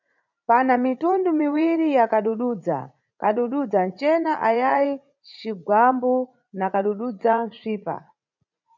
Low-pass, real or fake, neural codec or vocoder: 7.2 kHz; fake; vocoder, 44.1 kHz, 80 mel bands, Vocos